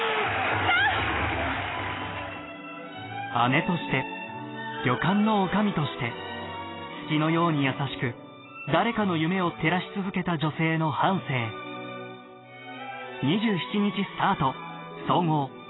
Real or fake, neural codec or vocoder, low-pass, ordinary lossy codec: real; none; 7.2 kHz; AAC, 16 kbps